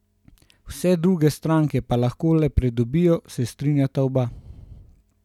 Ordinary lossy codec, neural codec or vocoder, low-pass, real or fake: none; none; 19.8 kHz; real